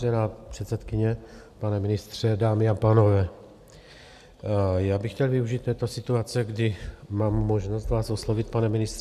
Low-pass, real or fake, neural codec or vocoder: 14.4 kHz; real; none